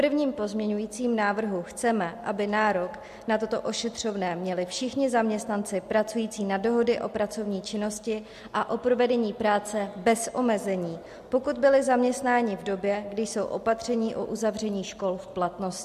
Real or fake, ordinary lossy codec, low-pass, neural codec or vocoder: real; MP3, 64 kbps; 14.4 kHz; none